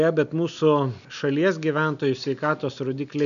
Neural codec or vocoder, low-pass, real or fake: none; 7.2 kHz; real